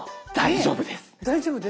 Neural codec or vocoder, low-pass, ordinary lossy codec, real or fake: none; none; none; real